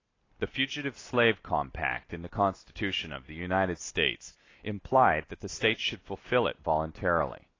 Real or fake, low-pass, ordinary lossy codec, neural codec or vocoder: real; 7.2 kHz; AAC, 32 kbps; none